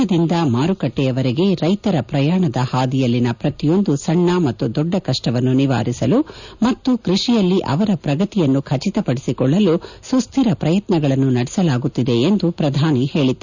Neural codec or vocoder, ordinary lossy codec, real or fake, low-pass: none; none; real; 7.2 kHz